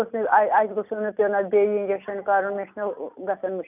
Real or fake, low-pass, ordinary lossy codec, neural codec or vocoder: real; 3.6 kHz; none; none